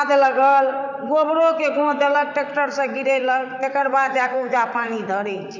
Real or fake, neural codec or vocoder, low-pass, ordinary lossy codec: fake; vocoder, 44.1 kHz, 128 mel bands, Pupu-Vocoder; 7.2 kHz; none